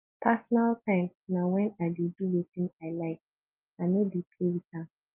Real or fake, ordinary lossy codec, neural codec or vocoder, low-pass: real; Opus, 32 kbps; none; 3.6 kHz